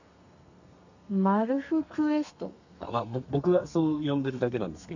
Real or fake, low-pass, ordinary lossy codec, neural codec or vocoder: fake; 7.2 kHz; none; codec, 32 kHz, 1.9 kbps, SNAC